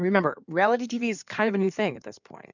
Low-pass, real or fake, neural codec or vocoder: 7.2 kHz; fake; codec, 16 kHz in and 24 kHz out, 2.2 kbps, FireRedTTS-2 codec